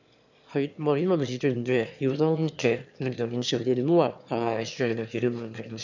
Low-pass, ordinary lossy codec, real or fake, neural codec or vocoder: 7.2 kHz; none; fake; autoencoder, 22.05 kHz, a latent of 192 numbers a frame, VITS, trained on one speaker